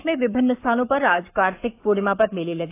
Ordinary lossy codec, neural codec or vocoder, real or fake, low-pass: AAC, 24 kbps; codec, 44.1 kHz, 7.8 kbps, Pupu-Codec; fake; 3.6 kHz